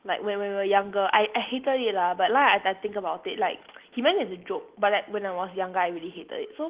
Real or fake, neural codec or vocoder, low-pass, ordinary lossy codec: real; none; 3.6 kHz; Opus, 16 kbps